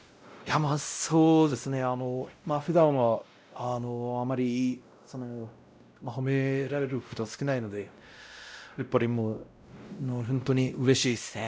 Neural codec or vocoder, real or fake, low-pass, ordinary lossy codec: codec, 16 kHz, 0.5 kbps, X-Codec, WavLM features, trained on Multilingual LibriSpeech; fake; none; none